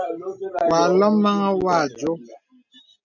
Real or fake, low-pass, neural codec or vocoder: real; 7.2 kHz; none